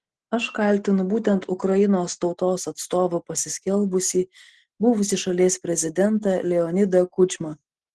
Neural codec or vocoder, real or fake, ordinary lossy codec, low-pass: none; real; Opus, 16 kbps; 10.8 kHz